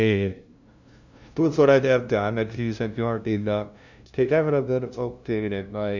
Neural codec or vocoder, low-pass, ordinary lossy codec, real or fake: codec, 16 kHz, 0.5 kbps, FunCodec, trained on LibriTTS, 25 frames a second; 7.2 kHz; none; fake